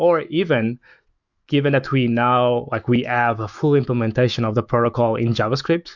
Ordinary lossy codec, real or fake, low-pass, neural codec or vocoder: Opus, 64 kbps; fake; 7.2 kHz; autoencoder, 48 kHz, 128 numbers a frame, DAC-VAE, trained on Japanese speech